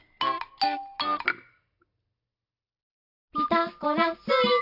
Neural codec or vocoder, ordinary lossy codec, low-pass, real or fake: none; AAC, 48 kbps; 5.4 kHz; real